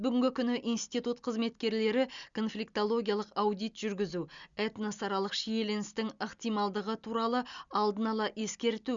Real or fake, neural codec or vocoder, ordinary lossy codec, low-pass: real; none; Opus, 64 kbps; 7.2 kHz